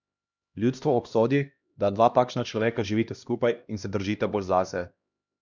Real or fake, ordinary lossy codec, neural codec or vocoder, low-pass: fake; none; codec, 16 kHz, 1 kbps, X-Codec, HuBERT features, trained on LibriSpeech; 7.2 kHz